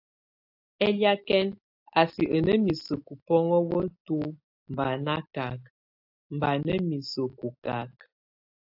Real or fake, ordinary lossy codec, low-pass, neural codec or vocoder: real; AAC, 48 kbps; 5.4 kHz; none